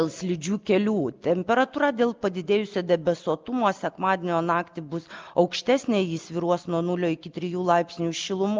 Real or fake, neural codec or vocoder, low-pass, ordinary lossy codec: real; none; 7.2 kHz; Opus, 32 kbps